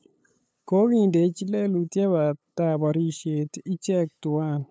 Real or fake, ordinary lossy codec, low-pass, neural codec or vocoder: fake; none; none; codec, 16 kHz, 8 kbps, FunCodec, trained on LibriTTS, 25 frames a second